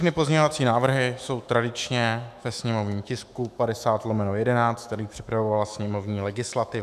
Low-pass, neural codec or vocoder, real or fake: 14.4 kHz; autoencoder, 48 kHz, 128 numbers a frame, DAC-VAE, trained on Japanese speech; fake